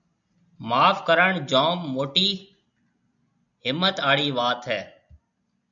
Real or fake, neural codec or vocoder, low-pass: real; none; 7.2 kHz